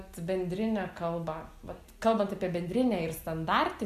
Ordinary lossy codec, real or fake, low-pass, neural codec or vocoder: AAC, 96 kbps; real; 14.4 kHz; none